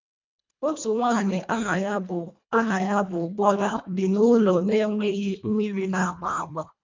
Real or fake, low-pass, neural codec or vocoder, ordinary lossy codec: fake; 7.2 kHz; codec, 24 kHz, 1.5 kbps, HILCodec; MP3, 64 kbps